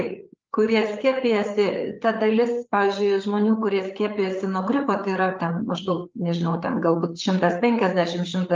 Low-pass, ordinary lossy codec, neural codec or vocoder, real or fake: 7.2 kHz; Opus, 24 kbps; codec, 16 kHz, 4 kbps, FreqCodec, larger model; fake